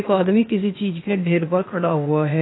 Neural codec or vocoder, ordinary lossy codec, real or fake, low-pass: codec, 16 kHz, 0.8 kbps, ZipCodec; AAC, 16 kbps; fake; 7.2 kHz